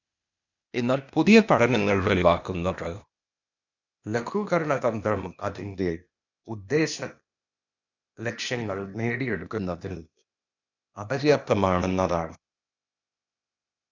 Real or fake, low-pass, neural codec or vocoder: fake; 7.2 kHz; codec, 16 kHz, 0.8 kbps, ZipCodec